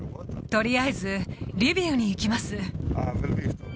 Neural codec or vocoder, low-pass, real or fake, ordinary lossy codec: none; none; real; none